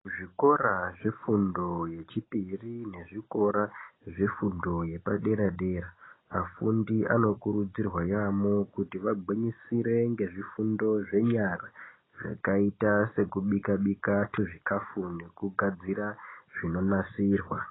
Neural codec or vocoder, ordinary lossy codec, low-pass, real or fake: none; AAC, 16 kbps; 7.2 kHz; real